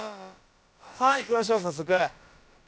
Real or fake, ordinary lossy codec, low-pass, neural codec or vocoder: fake; none; none; codec, 16 kHz, about 1 kbps, DyCAST, with the encoder's durations